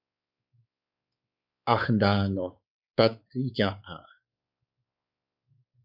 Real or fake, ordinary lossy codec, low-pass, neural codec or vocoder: fake; Opus, 64 kbps; 5.4 kHz; codec, 16 kHz, 4 kbps, X-Codec, WavLM features, trained on Multilingual LibriSpeech